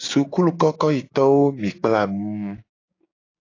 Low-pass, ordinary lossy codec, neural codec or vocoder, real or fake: 7.2 kHz; AAC, 32 kbps; codec, 16 kHz, 6 kbps, DAC; fake